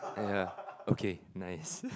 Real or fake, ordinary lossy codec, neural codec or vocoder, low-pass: real; none; none; none